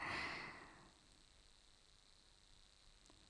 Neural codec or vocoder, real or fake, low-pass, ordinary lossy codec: vocoder, 44.1 kHz, 128 mel bands every 256 samples, BigVGAN v2; fake; 9.9 kHz; none